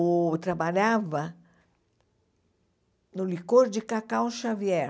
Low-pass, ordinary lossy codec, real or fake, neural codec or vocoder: none; none; real; none